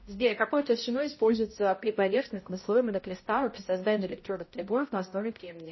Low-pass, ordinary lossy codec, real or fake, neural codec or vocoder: 7.2 kHz; MP3, 24 kbps; fake; codec, 16 kHz, 0.5 kbps, X-Codec, HuBERT features, trained on balanced general audio